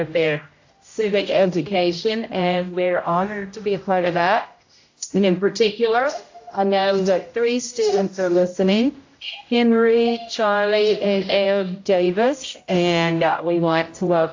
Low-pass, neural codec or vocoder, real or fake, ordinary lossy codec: 7.2 kHz; codec, 16 kHz, 0.5 kbps, X-Codec, HuBERT features, trained on general audio; fake; AAC, 48 kbps